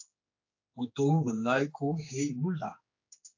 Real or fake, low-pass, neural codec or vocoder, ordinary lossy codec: fake; 7.2 kHz; codec, 16 kHz, 2 kbps, X-Codec, HuBERT features, trained on general audio; AAC, 48 kbps